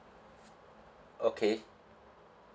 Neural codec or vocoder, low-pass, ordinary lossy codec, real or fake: none; none; none; real